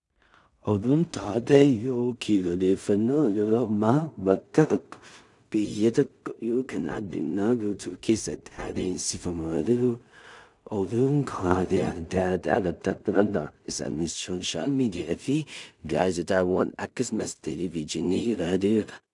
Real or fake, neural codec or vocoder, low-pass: fake; codec, 16 kHz in and 24 kHz out, 0.4 kbps, LongCat-Audio-Codec, two codebook decoder; 10.8 kHz